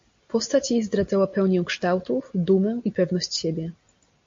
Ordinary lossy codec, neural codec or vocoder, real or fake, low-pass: MP3, 48 kbps; none; real; 7.2 kHz